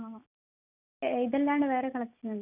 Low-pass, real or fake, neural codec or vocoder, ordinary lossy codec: 3.6 kHz; real; none; none